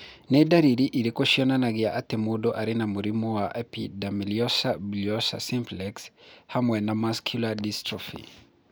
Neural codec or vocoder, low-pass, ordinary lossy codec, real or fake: none; none; none; real